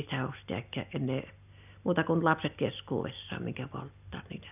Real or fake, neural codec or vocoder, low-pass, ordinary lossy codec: real; none; 3.6 kHz; none